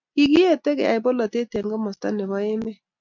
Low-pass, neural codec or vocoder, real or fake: 7.2 kHz; none; real